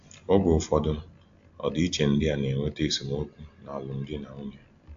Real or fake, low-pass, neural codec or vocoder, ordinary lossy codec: real; 7.2 kHz; none; AAC, 64 kbps